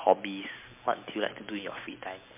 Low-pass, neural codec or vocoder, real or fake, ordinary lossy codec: 3.6 kHz; none; real; MP3, 32 kbps